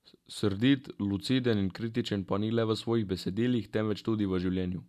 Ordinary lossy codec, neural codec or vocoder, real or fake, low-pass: none; none; real; 14.4 kHz